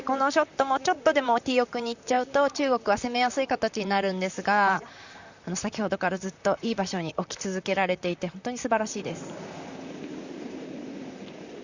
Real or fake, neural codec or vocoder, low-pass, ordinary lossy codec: fake; vocoder, 44.1 kHz, 128 mel bands, Pupu-Vocoder; 7.2 kHz; Opus, 64 kbps